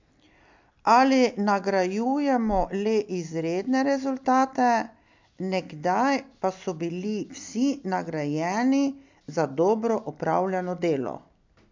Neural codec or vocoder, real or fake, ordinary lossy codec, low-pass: none; real; MP3, 64 kbps; 7.2 kHz